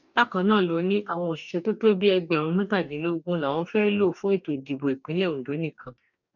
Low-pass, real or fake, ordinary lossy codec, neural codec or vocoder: 7.2 kHz; fake; none; codec, 44.1 kHz, 2.6 kbps, DAC